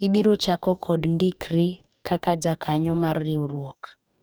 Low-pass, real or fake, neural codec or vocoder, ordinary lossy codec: none; fake; codec, 44.1 kHz, 2.6 kbps, DAC; none